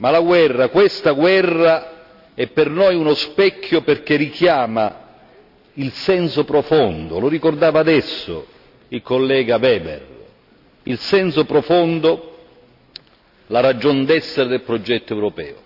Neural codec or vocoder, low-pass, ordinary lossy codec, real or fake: none; 5.4 kHz; none; real